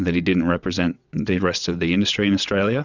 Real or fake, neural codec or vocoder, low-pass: fake; vocoder, 22.05 kHz, 80 mel bands, WaveNeXt; 7.2 kHz